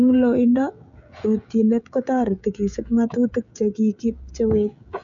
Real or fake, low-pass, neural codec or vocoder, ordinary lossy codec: fake; 7.2 kHz; codec, 16 kHz, 16 kbps, FreqCodec, smaller model; none